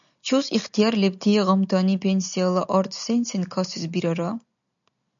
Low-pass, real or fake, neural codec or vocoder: 7.2 kHz; real; none